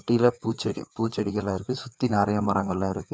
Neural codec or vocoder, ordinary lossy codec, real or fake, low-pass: codec, 16 kHz, 4 kbps, FreqCodec, larger model; none; fake; none